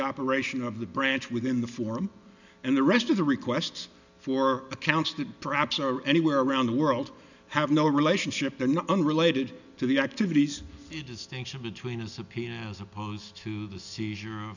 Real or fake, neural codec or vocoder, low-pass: real; none; 7.2 kHz